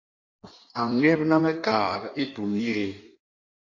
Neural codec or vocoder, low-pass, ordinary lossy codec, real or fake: codec, 16 kHz in and 24 kHz out, 1.1 kbps, FireRedTTS-2 codec; 7.2 kHz; AAC, 48 kbps; fake